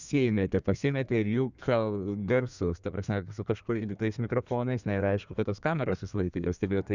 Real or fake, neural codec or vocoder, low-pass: fake; codec, 32 kHz, 1.9 kbps, SNAC; 7.2 kHz